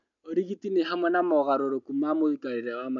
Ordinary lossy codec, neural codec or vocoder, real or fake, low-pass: none; none; real; 7.2 kHz